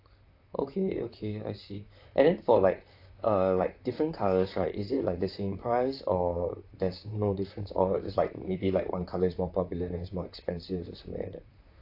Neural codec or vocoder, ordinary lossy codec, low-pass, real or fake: vocoder, 44.1 kHz, 128 mel bands, Pupu-Vocoder; AAC, 32 kbps; 5.4 kHz; fake